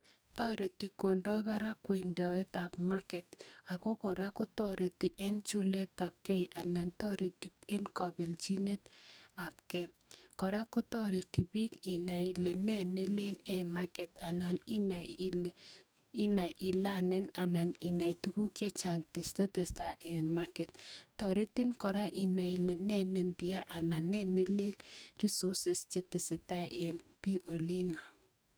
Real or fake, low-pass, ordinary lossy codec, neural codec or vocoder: fake; none; none; codec, 44.1 kHz, 2.6 kbps, DAC